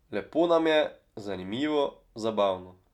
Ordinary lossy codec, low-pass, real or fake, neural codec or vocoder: none; 19.8 kHz; real; none